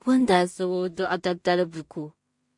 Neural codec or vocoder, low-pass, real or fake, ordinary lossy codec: codec, 16 kHz in and 24 kHz out, 0.4 kbps, LongCat-Audio-Codec, two codebook decoder; 10.8 kHz; fake; MP3, 48 kbps